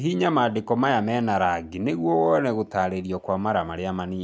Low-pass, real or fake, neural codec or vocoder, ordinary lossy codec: none; real; none; none